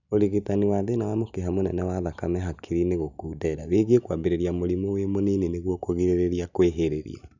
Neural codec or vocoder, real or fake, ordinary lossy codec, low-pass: none; real; none; 7.2 kHz